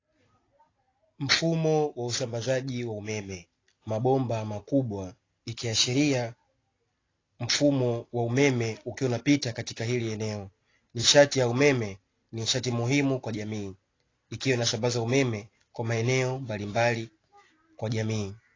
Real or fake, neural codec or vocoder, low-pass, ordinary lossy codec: real; none; 7.2 kHz; AAC, 32 kbps